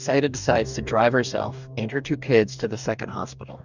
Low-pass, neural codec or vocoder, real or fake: 7.2 kHz; codec, 44.1 kHz, 2.6 kbps, DAC; fake